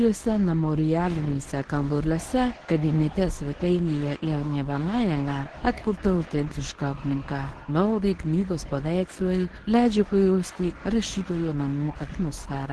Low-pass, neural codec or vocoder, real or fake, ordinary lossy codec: 10.8 kHz; codec, 24 kHz, 0.9 kbps, WavTokenizer, medium speech release version 1; fake; Opus, 16 kbps